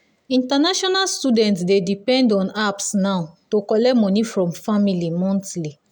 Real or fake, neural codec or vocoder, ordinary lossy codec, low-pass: real; none; none; none